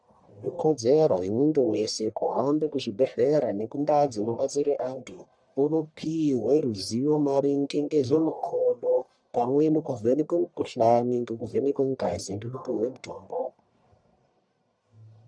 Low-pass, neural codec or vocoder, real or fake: 9.9 kHz; codec, 44.1 kHz, 1.7 kbps, Pupu-Codec; fake